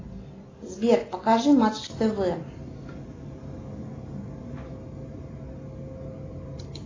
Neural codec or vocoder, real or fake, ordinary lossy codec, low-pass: none; real; AAC, 32 kbps; 7.2 kHz